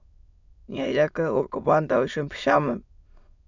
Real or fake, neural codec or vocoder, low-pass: fake; autoencoder, 22.05 kHz, a latent of 192 numbers a frame, VITS, trained on many speakers; 7.2 kHz